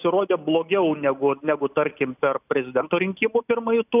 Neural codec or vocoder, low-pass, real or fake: none; 3.6 kHz; real